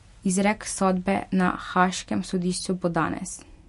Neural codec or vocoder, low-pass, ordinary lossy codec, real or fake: none; 14.4 kHz; MP3, 48 kbps; real